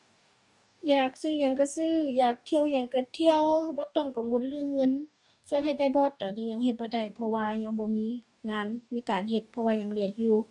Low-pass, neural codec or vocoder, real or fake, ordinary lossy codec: 10.8 kHz; codec, 44.1 kHz, 2.6 kbps, DAC; fake; none